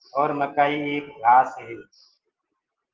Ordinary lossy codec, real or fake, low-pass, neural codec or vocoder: Opus, 16 kbps; real; 7.2 kHz; none